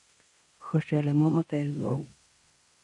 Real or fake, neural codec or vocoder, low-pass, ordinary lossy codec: fake; codec, 16 kHz in and 24 kHz out, 0.9 kbps, LongCat-Audio-Codec, fine tuned four codebook decoder; 10.8 kHz; MP3, 96 kbps